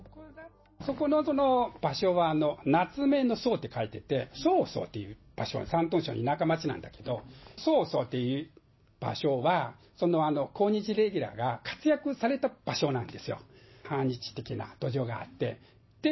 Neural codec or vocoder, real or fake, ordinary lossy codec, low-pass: none; real; MP3, 24 kbps; 7.2 kHz